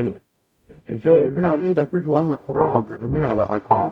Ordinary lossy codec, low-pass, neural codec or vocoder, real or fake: none; 19.8 kHz; codec, 44.1 kHz, 0.9 kbps, DAC; fake